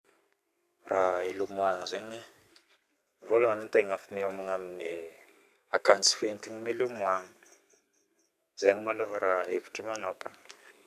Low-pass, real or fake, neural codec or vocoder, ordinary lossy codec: 14.4 kHz; fake; codec, 32 kHz, 1.9 kbps, SNAC; none